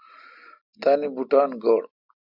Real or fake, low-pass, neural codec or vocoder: real; 5.4 kHz; none